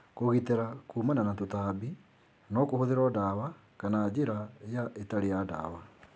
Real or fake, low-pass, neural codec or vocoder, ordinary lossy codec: real; none; none; none